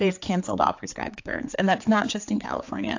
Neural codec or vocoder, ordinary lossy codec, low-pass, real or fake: codec, 16 kHz, 4 kbps, X-Codec, HuBERT features, trained on general audio; AAC, 48 kbps; 7.2 kHz; fake